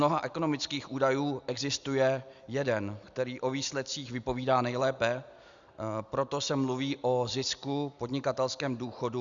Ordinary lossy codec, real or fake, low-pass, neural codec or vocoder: Opus, 64 kbps; real; 7.2 kHz; none